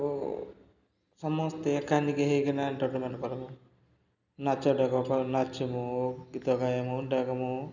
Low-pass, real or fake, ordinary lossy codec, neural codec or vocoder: 7.2 kHz; real; none; none